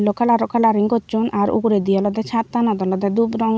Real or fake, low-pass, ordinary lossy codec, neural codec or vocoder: real; none; none; none